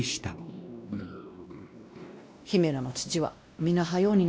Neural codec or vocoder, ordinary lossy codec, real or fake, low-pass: codec, 16 kHz, 1 kbps, X-Codec, WavLM features, trained on Multilingual LibriSpeech; none; fake; none